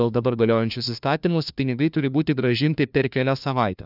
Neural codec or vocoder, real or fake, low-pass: codec, 16 kHz, 1 kbps, FunCodec, trained on LibriTTS, 50 frames a second; fake; 5.4 kHz